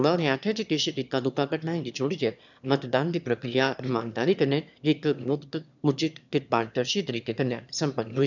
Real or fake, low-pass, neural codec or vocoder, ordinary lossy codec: fake; 7.2 kHz; autoencoder, 22.05 kHz, a latent of 192 numbers a frame, VITS, trained on one speaker; none